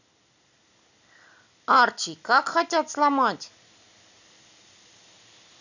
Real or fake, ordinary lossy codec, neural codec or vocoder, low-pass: real; none; none; 7.2 kHz